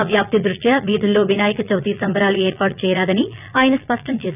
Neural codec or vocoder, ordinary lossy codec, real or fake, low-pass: vocoder, 22.05 kHz, 80 mel bands, Vocos; none; fake; 3.6 kHz